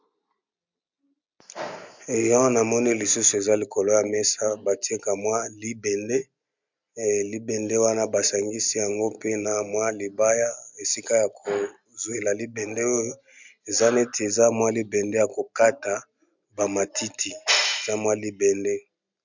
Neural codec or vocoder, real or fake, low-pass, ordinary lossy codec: none; real; 7.2 kHz; MP3, 64 kbps